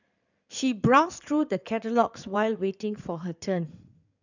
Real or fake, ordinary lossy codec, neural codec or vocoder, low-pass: fake; none; codec, 16 kHz in and 24 kHz out, 2.2 kbps, FireRedTTS-2 codec; 7.2 kHz